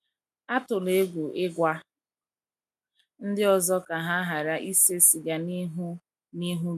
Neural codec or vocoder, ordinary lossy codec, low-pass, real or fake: none; MP3, 96 kbps; 14.4 kHz; real